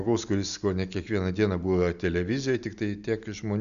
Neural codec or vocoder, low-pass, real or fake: none; 7.2 kHz; real